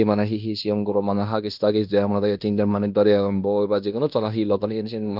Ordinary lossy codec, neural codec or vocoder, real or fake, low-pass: none; codec, 16 kHz in and 24 kHz out, 0.9 kbps, LongCat-Audio-Codec, fine tuned four codebook decoder; fake; 5.4 kHz